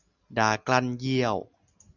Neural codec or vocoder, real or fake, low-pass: none; real; 7.2 kHz